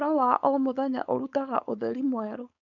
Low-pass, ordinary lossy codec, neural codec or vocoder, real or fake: 7.2 kHz; none; codec, 16 kHz, 4.8 kbps, FACodec; fake